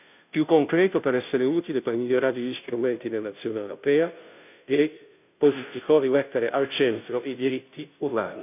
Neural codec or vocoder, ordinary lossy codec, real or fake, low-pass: codec, 16 kHz, 0.5 kbps, FunCodec, trained on Chinese and English, 25 frames a second; none; fake; 3.6 kHz